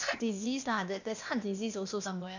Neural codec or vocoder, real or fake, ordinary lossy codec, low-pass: codec, 16 kHz, 0.8 kbps, ZipCodec; fake; none; 7.2 kHz